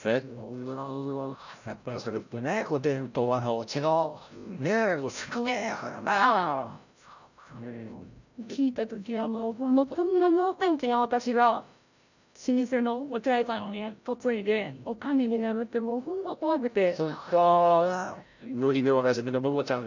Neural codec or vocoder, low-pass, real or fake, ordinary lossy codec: codec, 16 kHz, 0.5 kbps, FreqCodec, larger model; 7.2 kHz; fake; none